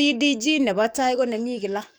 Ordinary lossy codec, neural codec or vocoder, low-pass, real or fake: none; codec, 44.1 kHz, 7.8 kbps, DAC; none; fake